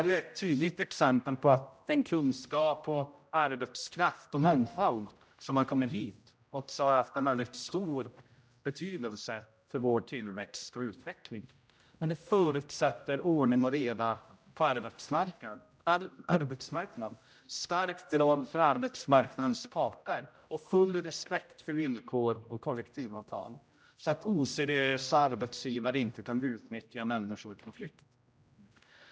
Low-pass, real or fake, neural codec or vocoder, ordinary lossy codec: none; fake; codec, 16 kHz, 0.5 kbps, X-Codec, HuBERT features, trained on general audio; none